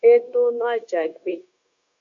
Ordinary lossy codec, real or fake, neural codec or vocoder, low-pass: AAC, 48 kbps; fake; codec, 16 kHz, 0.9 kbps, LongCat-Audio-Codec; 7.2 kHz